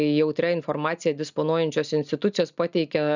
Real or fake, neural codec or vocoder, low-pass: real; none; 7.2 kHz